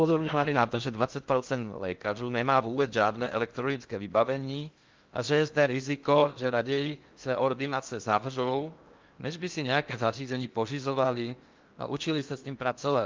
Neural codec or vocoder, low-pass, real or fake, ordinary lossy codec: codec, 16 kHz in and 24 kHz out, 0.6 kbps, FocalCodec, streaming, 4096 codes; 7.2 kHz; fake; Opus, 24 kbps